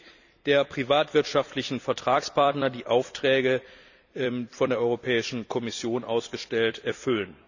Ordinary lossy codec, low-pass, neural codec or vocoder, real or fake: none; 7.2 kHz; vocoder, 44.1 kHz, 128 mel bands every 256 samples, BigVGAN v2; fake